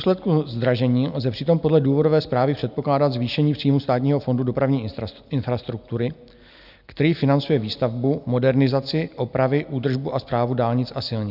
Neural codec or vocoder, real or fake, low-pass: none; real; 5.4 kHz